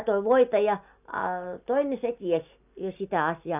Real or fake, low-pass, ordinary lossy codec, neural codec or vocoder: real; 3.6 kHz; none; none